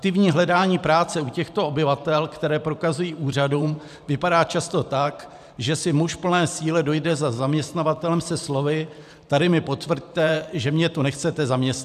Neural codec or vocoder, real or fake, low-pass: vocoder, 44.1 kHz, 128 mel bands every 512 samples, BigVGAN v2; fake; 14.4 kHz